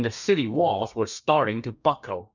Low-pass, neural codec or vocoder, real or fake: 7.2 kHz; codec, 32 kHz, 1.9 kbps, SNAC; fake